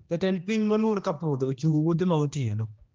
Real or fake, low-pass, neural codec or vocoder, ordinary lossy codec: fake; 7.2 kHz; codec, 16 kHz, 1 kbps, X-Codec, HuBERT features, trained on general audio; Opus, 24 kbps